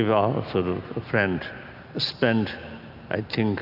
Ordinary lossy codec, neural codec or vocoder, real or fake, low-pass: AAC, 48 kbps; none; real; 5.4 kHz